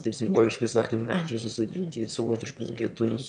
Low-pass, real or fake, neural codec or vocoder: 9.9 kHz; fake; autoencoder, 22.05 kHz, a latent of 192 numbers a frame, VITS, trained on one speaker